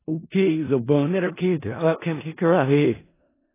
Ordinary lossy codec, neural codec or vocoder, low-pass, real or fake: AAC, 16 kbps; codec, 16 kHz in and 24 kHz out, 0.4 kbps, LongCat-Audio-Codec, four codebook decoder; 3.6 kHz; fake